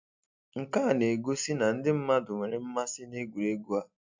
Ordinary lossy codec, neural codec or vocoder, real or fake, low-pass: MP3, 64 kbps; none; real; 7.2 kHz